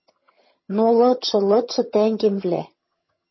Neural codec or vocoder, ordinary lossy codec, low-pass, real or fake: vocoder, 22.05 kHz, 80 mel bands, HiFi-GAN; MP3, 24 kbps; 7.2 kHz; fake